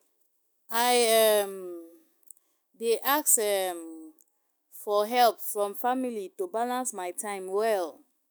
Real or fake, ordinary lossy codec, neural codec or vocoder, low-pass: fake; none; autoencoder, 48 kHz, 128 numbers a frame, DAC-VAE, trained on Japanese speech; none